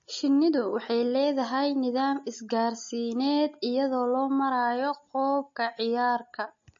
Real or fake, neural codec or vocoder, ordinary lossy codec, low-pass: real; none; MP3, 32 kbps; 7.2 kHz